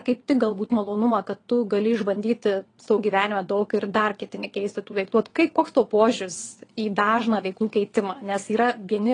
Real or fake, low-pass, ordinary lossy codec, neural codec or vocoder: fake; 9.9 kHz; AAC, 32 kbps; vocoder, 22.05 kHz, 80 mel bands, WaveNeXt